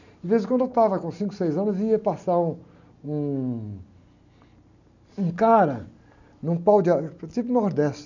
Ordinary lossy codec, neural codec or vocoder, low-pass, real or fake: none; none; 7.2 kHz; real